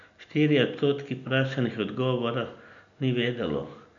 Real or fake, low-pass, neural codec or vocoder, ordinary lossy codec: real; 7.2 kHz; none; none